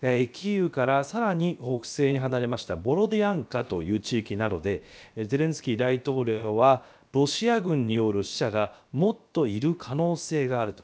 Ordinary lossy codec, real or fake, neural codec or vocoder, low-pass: none; fake; codec, 16 kHz, about 1 kbps, DyCAST, with the encoder's durations; none